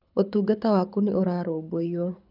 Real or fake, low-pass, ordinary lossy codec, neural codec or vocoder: fake; 5.4 kHz; none; codec, 24 kHz, 6 kbps, HILCodec